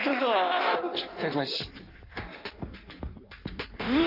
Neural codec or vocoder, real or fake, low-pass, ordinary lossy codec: codec, 16 kHz in and 24 kHz out, 1.1 kbps, FireRedTTS-2 codec; fake; 5.4 kHz; none